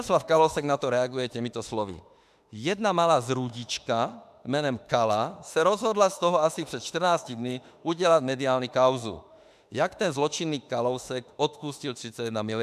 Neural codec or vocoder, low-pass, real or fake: autoencoder, 48 kHz, 32 numbers a frame, DAC-VAE, trained on Japanese speech; 14.4 kHz; fake